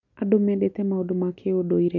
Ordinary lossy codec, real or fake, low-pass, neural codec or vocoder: MP3, 48 kbps; real; 7.2 kHz; none